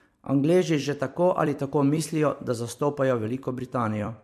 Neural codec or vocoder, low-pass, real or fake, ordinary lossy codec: vocoder, 44.1 kHz, 128 mel bands every 256 samples, BigVGAN v2; 14.4 kHz; fake; MP3, 64 kbps